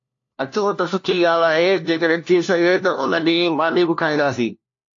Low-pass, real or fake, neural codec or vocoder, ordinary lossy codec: 7.2 kHz; fake; codec, 16 kHz, 1 kbps, FunCodec, trained on LibriTTS, 50 frames a second; AAC, 48 kbps